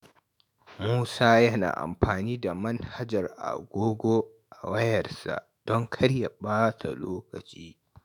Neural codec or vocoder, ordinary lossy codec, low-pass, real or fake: autoencoder, 48 kHz, 128 numbers a frame, DAC-VAE, trained on Japanese speech; none; none; fake